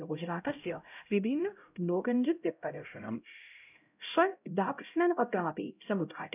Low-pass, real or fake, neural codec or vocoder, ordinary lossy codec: 3.6 kHz; fake; codec, 16 kHz, 0.5 kbps, X-Codec, HuBERT features, trained on LibriSpeech; none